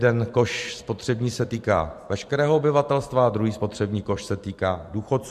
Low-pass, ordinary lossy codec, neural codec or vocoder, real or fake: 14.4 kHz; MP3, 64 kbps; vocoder, 44.1 kHz, 128 mel bands every 256 samples, BigVGAN v2; fake